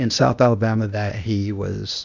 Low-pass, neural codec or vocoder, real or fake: 7.2 kHz; codec, 16 kHz, 0.8 kbps, ZipCodec; fake